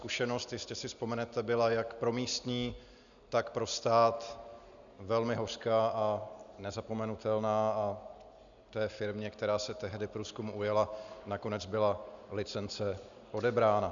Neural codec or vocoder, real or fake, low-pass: none; real; 7.2 kHz